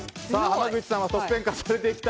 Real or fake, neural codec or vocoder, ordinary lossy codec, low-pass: real; none; none; none